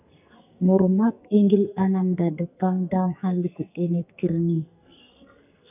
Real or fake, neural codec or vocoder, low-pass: fake; codec, 44.1 kHz, 2.6 kbps, SNAC; 3.6 kHz